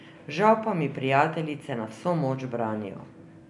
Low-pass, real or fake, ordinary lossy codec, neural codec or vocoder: 10.8 kHz; real; none; none